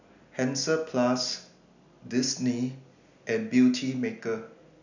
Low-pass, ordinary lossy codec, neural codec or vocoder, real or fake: 7.2 kHz; none; none; real